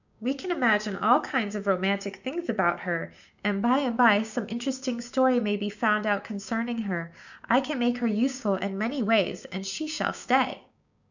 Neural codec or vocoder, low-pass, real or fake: codec, 16 kHz, 6 kbps, DAC; 7.2 kHz; fake